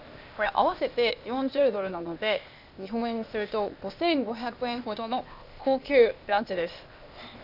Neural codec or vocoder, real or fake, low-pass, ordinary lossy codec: codec, 16 kHz, 0.8 kbps, ZipCodec; fake; 5.4 kHz; none